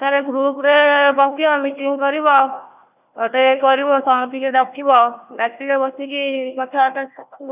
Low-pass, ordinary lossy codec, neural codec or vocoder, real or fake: 3.6 kHz; none; codec, 16 kHz, 1 kbps, FunCodec, trained on Chinese and English, 50 frames a second; fake